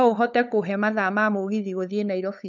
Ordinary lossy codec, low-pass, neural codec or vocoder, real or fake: none; 7.2 kHz; codec, 16 kHz, 8 kbps, FunCodec, trained on LibriTTS, 25 frames a second; fake